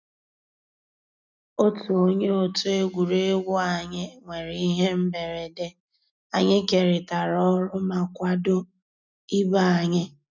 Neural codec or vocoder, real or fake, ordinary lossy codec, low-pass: none; real; none; 7.2 kHz